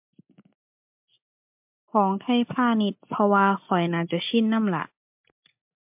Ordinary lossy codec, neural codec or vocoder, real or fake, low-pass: MP3, 32 kbps; none; real; 3.6 kHz